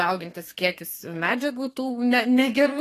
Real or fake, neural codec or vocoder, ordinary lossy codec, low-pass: fake; codec, 32 kHz, 1.9 kbps, SNAC; AAC, 48 kbps; 14.4 kHz